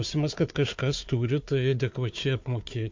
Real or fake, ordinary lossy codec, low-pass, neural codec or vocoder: fake; MP3, 48 kbps; 7.2 kHz; codec, 16 kHz, 4 kbps, FunCodec, trained on Chinese and English, 50 frames a second